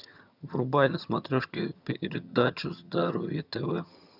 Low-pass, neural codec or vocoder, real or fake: 5.4 kHz; vocoder, 22.05 kHz, 80 mel bands, HiFi-GAN; fake